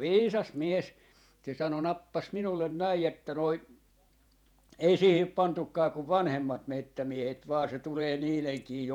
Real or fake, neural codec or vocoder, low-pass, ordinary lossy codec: fake; vocoder, 48 kHz, 128 mel bands, Vocos; 19.8 kHz; none